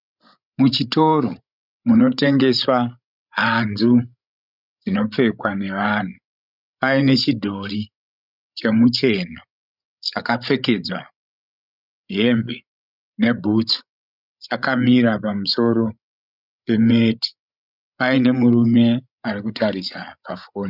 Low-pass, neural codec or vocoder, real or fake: 5.4 kHz; codec, 16 kHz, 16 kbps, FreqCodec, larger model; fake